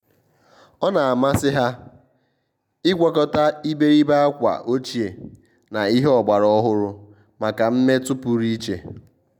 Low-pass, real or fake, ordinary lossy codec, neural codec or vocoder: 19.8 kHz; real; none; none